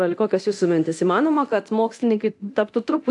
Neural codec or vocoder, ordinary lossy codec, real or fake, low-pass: codec, 24 kHz, 0.9 kbps, DualCodec; AAC, 64 kbps; fake; 10.8 kHz